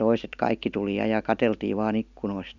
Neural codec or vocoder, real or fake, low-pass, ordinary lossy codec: none; real; 7.2 kHz; none